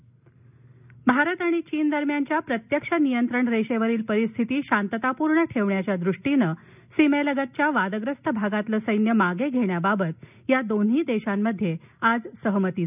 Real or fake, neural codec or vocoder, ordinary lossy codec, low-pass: real; none; none; 3.6 kHz